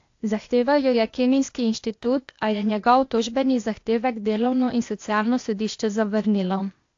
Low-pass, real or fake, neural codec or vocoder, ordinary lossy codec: 7.2 kHz; fake; codec, 16 kHz, 0.8 kbps, ZipCodec; AAC, 48 kbps